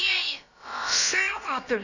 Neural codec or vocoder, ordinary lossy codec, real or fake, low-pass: codec, 16 kHz, about 1 kbps, DyCAST, with the encoder's durations; none; fake; 7.2 kHz